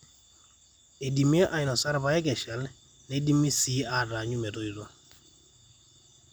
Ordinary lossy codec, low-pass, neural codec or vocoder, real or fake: none; none; none; real